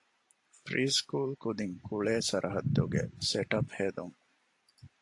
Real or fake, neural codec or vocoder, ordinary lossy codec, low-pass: real; none; AAC, 48 kbps; 10.8 kHz